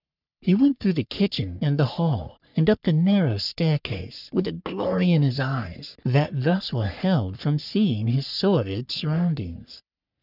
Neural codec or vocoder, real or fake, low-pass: codec, 44.1 kHz, 3.4 kbps, Pupu-Codec; fake; 5.4 kHz